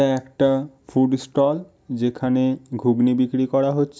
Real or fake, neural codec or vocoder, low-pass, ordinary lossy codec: real; none; none; none